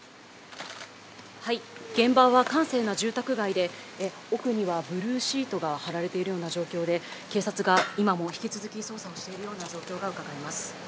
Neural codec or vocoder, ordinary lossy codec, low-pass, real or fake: none; none; none; real